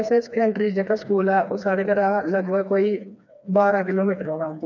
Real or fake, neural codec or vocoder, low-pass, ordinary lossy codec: fake; codec, 16 kHz, 2 kbps, FreqCodec, smaller model; 7.2 kHz; none